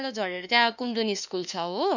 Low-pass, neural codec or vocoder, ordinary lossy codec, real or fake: 7.2 kHz; autoencoder, 48 kHz, 32 numbers a frame, DAC-VAE, trained on Japanese speech; none; fake